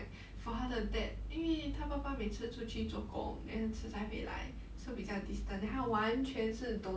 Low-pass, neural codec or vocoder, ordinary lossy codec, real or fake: none; none; none; real